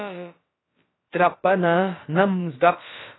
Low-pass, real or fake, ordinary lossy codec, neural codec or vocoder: 7.2 kHz; fake; AAC, 16 kbps; codec, 16 kHz, about 1 kbps, DyCAST, with the encoder's durations